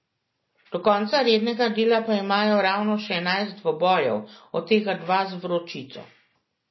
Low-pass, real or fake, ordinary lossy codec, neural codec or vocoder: 7.2 kHz; real; MP3, 24 kbps; none